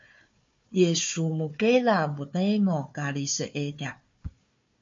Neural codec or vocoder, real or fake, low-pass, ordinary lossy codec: codec, 16 kHz, 4 kbps, FunCodec, trained on Chinese and English, 50 frames a second; fake; 7.2 kHz; MP3, 48 kbps